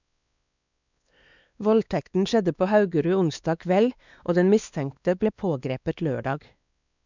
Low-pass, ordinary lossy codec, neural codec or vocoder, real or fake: 7.2 kHz; none; codec, 16 kHz, 2 kbps, X-Codec, WavLM features, trained on Multilingual LibriSpeech; fake